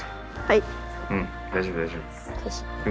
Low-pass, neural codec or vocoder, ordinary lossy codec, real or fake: none; none; none; real